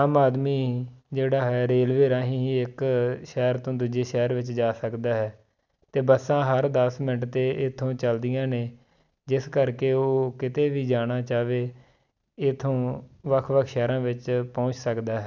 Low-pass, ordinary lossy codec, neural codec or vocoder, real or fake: 7.2 kHz; none; none; real